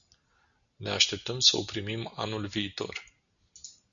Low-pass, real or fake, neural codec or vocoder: 7.2 kHz; real; none